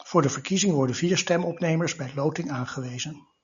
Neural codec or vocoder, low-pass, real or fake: none; 7.2 kHz; real